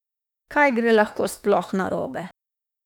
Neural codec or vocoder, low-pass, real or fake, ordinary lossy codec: autoencoder, 48 kHz, 32 numbers a frame, DAC-VAE, trained on Japanese speech; 19.8 kHz; fake; none